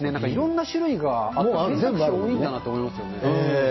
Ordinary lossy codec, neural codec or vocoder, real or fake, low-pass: MP3, 24 kbps; none; real; 7.2 kHz